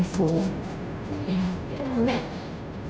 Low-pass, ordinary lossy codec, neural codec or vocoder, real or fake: none; none; codec, 16 kHz, 0.5 kbps, FunCodec, trained on Chinese and English, 25 frames a second; fake